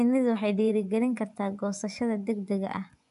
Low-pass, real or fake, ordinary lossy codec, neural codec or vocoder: 10.8 kHz; fake; none; vocoder, 24 kHz, 100 mel bands, Vocos